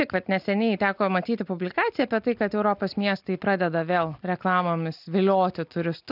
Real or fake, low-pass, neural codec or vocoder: real; 5.4 kHz; none